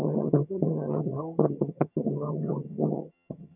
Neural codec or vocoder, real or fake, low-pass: vocoder, 22.05 kHz, 80 mel bands, HiFi-GAN; fake; 3.6 kHz